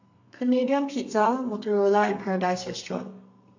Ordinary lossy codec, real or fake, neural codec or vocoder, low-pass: AAC, 48 kbps; fake; codec, 32 kHz, 1.9 kbps, SNAC; 7.2 kHz